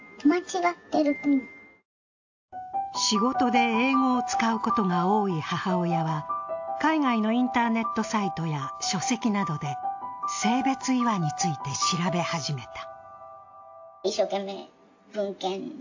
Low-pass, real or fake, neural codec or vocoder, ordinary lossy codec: 7.2 kHz; real; none; AAC, 48 kbps